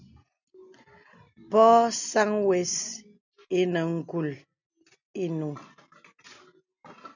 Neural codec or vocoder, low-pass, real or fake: none; 7.2 kHz; real